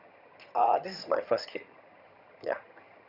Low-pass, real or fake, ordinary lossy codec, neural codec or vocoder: 5.4 kHz; fake; none; vocoder, 22.05 kHz, 80 mel bands, HiFi-GAN